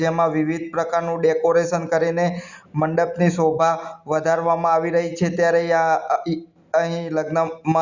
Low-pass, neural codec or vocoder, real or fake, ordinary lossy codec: 7.2 kHz; none; real; Opus, 64 kbps